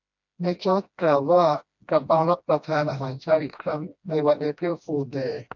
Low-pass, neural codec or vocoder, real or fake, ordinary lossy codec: 7.2 kHz; codec, 16 kHz, 1 kbps, FreqCodec, smaller model; fake; MP3, 64 kbps